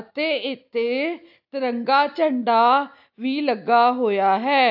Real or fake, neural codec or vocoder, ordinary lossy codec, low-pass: real; none; AAC, 48 kbps; 5.4 kHz